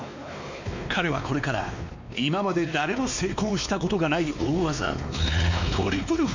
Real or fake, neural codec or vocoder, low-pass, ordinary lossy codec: fake; codec, 16 kHz, 2 kbps, X-Codec, WavLM features, trained on Multilingual LibriSpeech; 7.2 kHz; MP3, 64 kbps